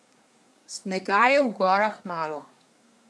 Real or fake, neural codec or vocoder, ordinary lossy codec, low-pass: fake; codec, 24 kHz, 1 kbps, SNAC; none; none